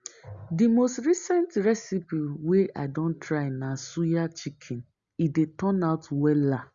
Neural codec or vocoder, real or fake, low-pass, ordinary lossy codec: none; real; 7.2 kHz; Opus, 64 kbps